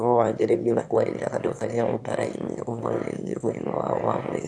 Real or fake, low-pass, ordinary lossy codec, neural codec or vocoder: fake; none; none; autoencoder, 22.05 kHz, a latent of 192 numbers a frame, VITS, trained on one speaker